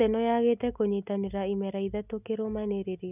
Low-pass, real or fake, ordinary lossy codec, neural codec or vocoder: 3.6 kHz; real; none; none